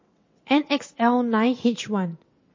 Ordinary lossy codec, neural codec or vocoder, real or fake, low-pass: MP3, 32 kbps; vocoder, 22.05 kHz, 80 mel bands, WaveNeXt; fake; 7.2 kHz